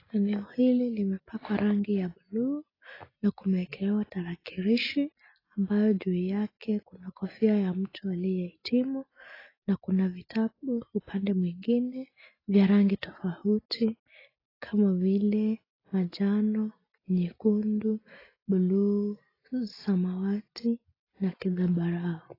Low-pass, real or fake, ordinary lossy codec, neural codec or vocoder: 5.4 kHz; real; AAC, 24 kbps; none